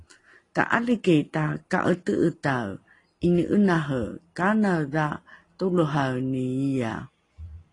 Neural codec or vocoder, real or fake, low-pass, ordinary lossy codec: none; real; 10.8 kHz; AAC, 32 kbps